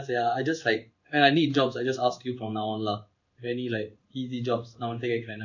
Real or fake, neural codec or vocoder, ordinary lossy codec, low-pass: fake; codec, 16 kHz in and 24 kHz out, 1 kbps, XY-Tokenizer; none; 7.2 kHz